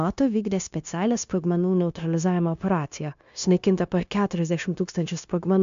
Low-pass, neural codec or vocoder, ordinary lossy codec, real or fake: 7.2 kHz; codec, 16 kHz, 0.9 kbps, LongCat-Audio-Codec; MP3, 64 kbps; fake